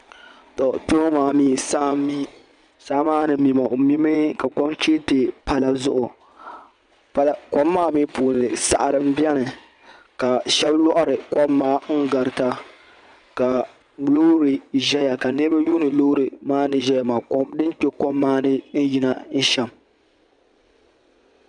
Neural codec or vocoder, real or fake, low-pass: vocoder, 22.05 kHz, 80 mel bands, Vocos; fake; 9.9 kHz